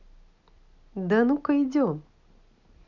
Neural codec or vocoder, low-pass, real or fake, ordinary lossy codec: none; 7.2 kHz; real; none